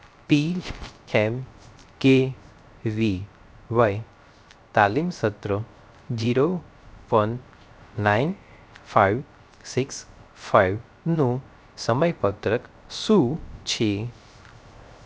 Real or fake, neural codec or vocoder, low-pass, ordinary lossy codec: fake; codec, 16 kHz, 0.3 kbps, FocalCodec; none; none